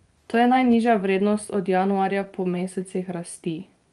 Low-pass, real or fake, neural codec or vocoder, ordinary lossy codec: 10.8 kHz; fake; vocoder, 24 kHz, 100 mel bands, Vocos; Opus, 32 kbps